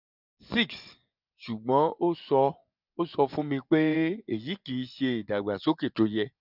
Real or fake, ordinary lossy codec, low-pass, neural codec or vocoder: fake; none; 5.4 kHz; vocoder, 24 kHz, 100 mel bands, Vocos